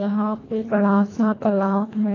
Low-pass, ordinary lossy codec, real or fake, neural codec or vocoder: 7.2 kHz; AAC, 32 kbps; fake; codec, 24 kHz, 1.5 kbps, HILCodec